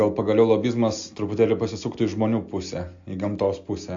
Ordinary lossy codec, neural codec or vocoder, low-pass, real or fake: AAC, 64 kbps; none; 7.2 kHz; real